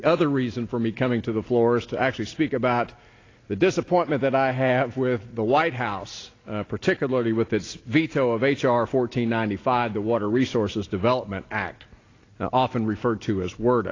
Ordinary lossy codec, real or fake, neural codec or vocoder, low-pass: AAC, 32 kbps; real; none; 7.2 kHz